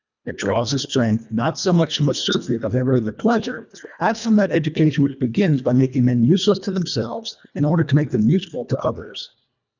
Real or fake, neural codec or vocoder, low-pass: fake; codec, 24 kHz, 1.5 kbps, HILCodec; 7.2 kHz